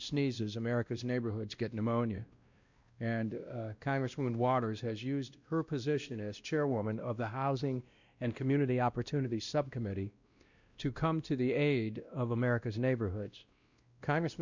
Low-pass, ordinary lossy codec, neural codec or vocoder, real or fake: 7.2 kHz; Opus, 64 kbps; codec, 16 kHz, 1 kbps, X-Codec, WavLM features, trained on Multilingual LibriSpeech; fake